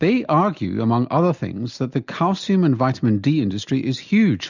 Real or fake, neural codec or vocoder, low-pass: real; none; 7.2 kHz